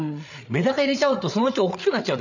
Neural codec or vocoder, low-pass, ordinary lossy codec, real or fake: codec, 16 kHz, 8 kbps, FreqCodec, larger model; 7.2 kHz; none; fake